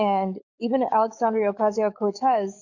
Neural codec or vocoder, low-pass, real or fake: codec, 44.1 kHz, 7.8 kbps, DAC; 7.2 kHz; fake